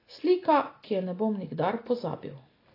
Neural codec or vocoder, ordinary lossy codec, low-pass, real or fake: none; AAC, 24 kbps; 5.4 kHz; real